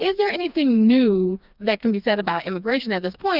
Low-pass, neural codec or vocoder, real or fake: 5.4 kHz; codec, 16 kHz, 2 kbps, FreqCodec, smaller model; fake